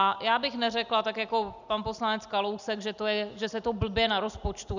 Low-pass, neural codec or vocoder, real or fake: 7.2 kHz; none; real